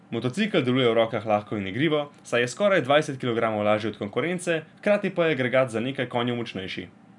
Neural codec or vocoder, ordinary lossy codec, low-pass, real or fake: none; none; 10.8 kHz; real